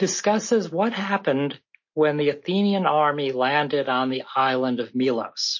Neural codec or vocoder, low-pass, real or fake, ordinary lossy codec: none; 7.2 kHz; real; MP3, 32 kbps